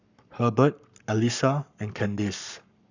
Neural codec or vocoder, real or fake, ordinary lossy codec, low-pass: codec, 44.1 kHz, 7.8 kbps, Pupu-Codec; fake; none; 7.2 kHz